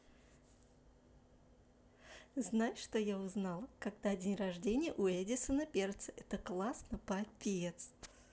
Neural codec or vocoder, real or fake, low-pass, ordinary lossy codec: none; real; none; none